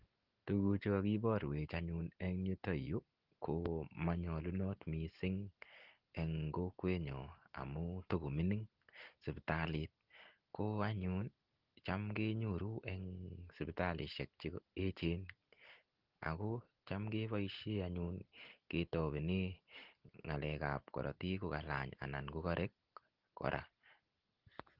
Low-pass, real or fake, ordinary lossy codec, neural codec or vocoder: 5.4 kHz; real; Opus, 16 kbps; none